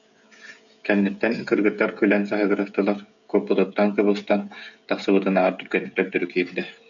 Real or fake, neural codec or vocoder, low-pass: fake; codec, 16 kHz, 16 kbps, FreqCodec, smaller model; 7.2 kHz